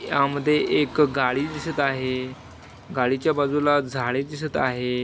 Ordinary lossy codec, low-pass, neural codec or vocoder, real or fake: none; none; none; real